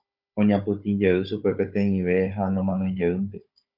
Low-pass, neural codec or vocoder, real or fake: 5.4 kHz; codec, 16 kHz, 16 kbps, FunCodec, trained on Chinese and English, 50 frames a second; fake